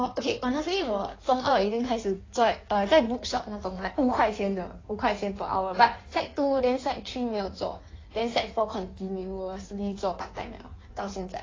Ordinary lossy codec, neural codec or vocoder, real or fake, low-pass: AAC, 32 kbps; codec, 16 kHz in and 24 kHz out, 1.1 kbps, FireRedTTS-2 codec; fake; 7.2 kHz